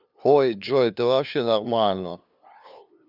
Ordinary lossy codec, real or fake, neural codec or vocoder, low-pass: none; fake; codec, 16 kHz, 2 kbps, FunCodec, trained on LibriTTS, 25 frames a second; 5.4 kHz